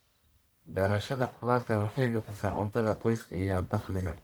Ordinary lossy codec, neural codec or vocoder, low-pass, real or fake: none; codec, 44.1 kHz, 1.7 kbps, Pupu-Codec; none; fake